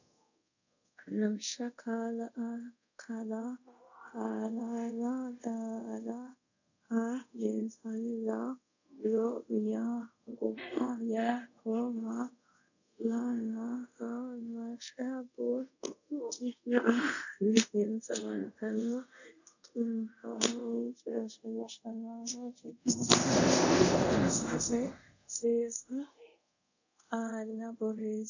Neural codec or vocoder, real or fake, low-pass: codec, 24 kHz, 0.5 kbps, DualCodec; fake; 7.2 kHz